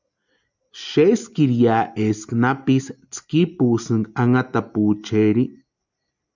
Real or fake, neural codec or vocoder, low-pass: real; none; 7.2 kHz